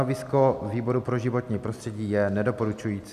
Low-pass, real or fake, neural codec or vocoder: 14.4 kHz; real; none